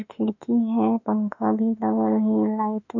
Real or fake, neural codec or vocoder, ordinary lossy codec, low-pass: fake; autoencoder, 48 kHz, 32 numbers a frame, DAC-VAE, trained on Japanese speech; none; 7.2 kHz